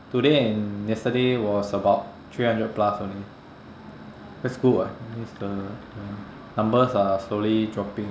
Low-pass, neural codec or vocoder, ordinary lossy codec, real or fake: none; none; none; real